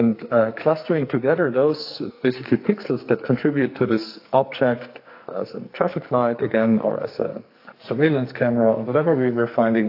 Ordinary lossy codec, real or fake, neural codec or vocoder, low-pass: AAC, 32 kbps; fake; codec, 44.1 kHz, 2.6 kbps, SNAC; 5.4 kHz